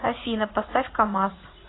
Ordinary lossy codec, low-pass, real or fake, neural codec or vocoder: AAC, 16 kbps; 7.2 kHz; fake; vocoder, 44.1 kHz, 80 mel bands, Vocos